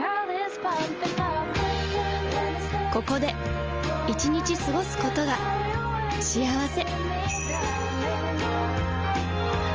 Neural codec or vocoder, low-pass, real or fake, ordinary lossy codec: none; 7.2 kHz; real; Opus, 24 kbps